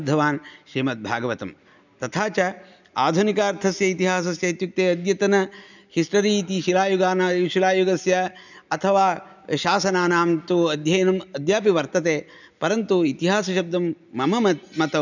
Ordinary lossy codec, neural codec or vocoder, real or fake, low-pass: none; none; real; 7.2 kHz